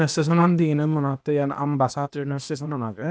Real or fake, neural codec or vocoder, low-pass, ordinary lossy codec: fake; codec, 16 kHz, 0.8 kbps, ZipCodec; none; none